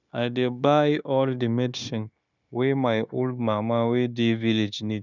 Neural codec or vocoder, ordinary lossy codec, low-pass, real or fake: codec, 16 kHz, 0.9 kbps, LongCat-Audio-Codec; none; 7.2 kHz; fake